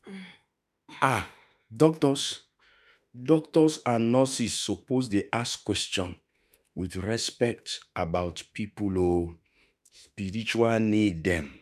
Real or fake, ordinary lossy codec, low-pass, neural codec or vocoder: fake; none; 14.4 kHz; autoencoder, 48 kHz, 32 numbers a frame, DAC-VAE, trained on Japanese speech